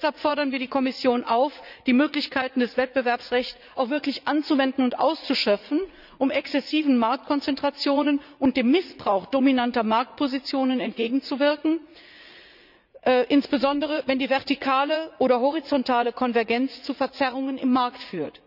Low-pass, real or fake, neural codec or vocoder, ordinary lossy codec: 5.4 kHz; fake; vocoder, 44.1 kHz, 80 mel bands, Vocos; none